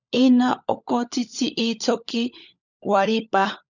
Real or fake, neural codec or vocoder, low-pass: fake; codec, 16 kHz, 16 kbps, FunCodec, trained on LibriTTS, 50 frames a second; 7.2 kHz